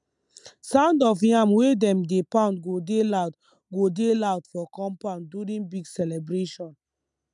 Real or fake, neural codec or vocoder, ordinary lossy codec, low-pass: real; none; MP3, 96 kbps; 10.8 kHz